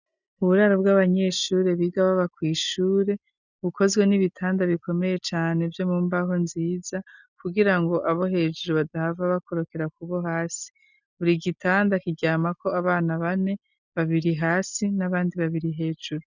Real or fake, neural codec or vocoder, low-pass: real; none; 7.2 kHz